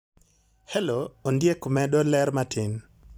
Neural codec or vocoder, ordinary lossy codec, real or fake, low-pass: vocoder, 44.1 kHz, 128 mel bands every 256 samples, BigVGAN v2; none; fake; none